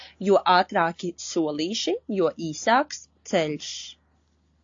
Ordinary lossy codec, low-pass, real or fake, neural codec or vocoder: AAC, 48 kbps; 7.2 kHz; real; none